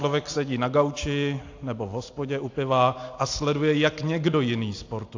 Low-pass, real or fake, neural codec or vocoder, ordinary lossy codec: 7.2 kHz; real; none; AAC, 48 kbps